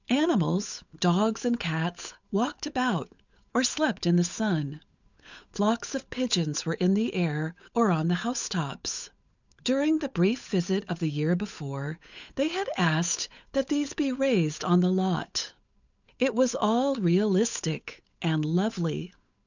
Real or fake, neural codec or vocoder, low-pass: fake; codec, 16 kHz, 8 kbps, FunCodec, trained on Chinese and English, 25 frames a second; 7.2 kHz